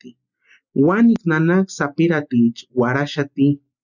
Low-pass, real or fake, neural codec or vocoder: 7.2 kHz; real; none